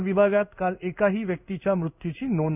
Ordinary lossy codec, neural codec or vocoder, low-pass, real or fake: none; codec, 16 kHz in and 24 kHz out, 1 kbps, XY-Tokenizer; 3.6 kHz; fake